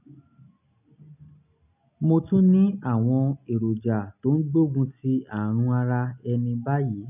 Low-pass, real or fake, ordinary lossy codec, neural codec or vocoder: 3.6 kHz; real; none; none